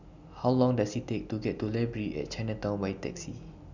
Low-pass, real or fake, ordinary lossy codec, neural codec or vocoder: 7.2 kHz; real; none; none